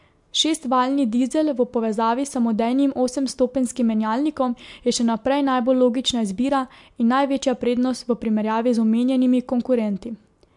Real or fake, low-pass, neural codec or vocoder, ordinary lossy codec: real; 10.8 kHz; none; MP3, 64 kbps